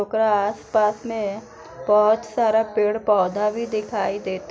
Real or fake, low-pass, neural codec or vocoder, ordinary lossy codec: real; none; none; none